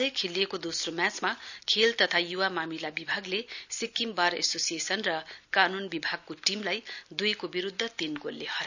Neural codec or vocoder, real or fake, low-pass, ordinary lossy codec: none; real; 7.2 kHz; none